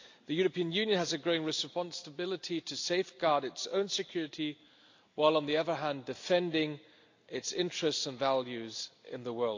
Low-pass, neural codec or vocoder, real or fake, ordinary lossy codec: 7.2 kHz; none; real; AAC, 48 kbps